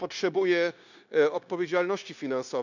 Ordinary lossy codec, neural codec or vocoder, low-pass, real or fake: none; codec, 16 kHz, 0.9 kbps, LongCat-Audio-Codec; 7.2 kHz; fake